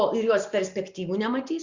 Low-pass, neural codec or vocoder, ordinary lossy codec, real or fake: 7.2 kHz; none; Opus, 64 kbps; real